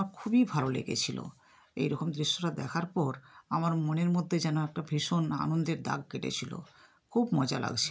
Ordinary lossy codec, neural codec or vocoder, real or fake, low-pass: none; none; real; none